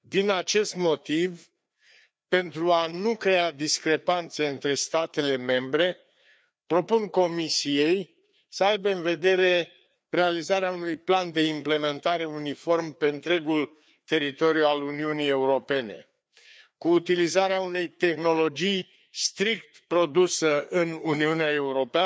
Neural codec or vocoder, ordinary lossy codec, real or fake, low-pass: codec, 16 kHz, 2 kbps, FreqCodec, larger model; none; fake; none